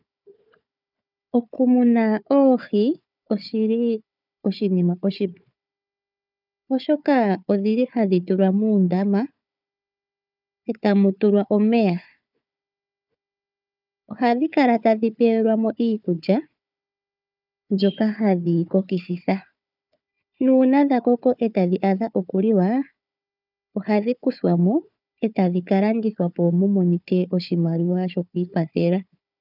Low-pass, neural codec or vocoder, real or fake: 5.4 kHz; codec, 16 kHz, 4 kbps, FunCodec, trained on Chinese and English, 50 frames a second; fake